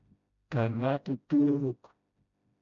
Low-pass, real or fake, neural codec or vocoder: 7.2 kHz; fake; codec, 16 kHz, 0.5 kbps, FreqCodec, smaller model